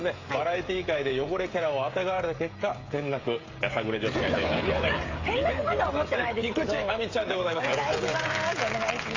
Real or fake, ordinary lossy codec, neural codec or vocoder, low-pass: fake; AAC, 32 kbps; codec, 16 kHz, 16 kbps, FreqCodec, smaller model; 7.2 kHz